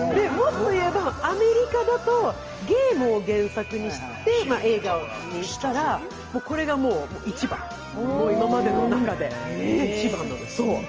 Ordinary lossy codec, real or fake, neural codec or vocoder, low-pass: Opus, 24 kbps; real; none; 7.2 kHz